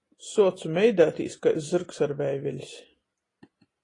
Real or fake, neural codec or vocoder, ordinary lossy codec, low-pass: real; none; AAC, 32 kbps; 10.8 kHz